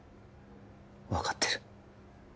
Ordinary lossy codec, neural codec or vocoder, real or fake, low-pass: none; none; real; none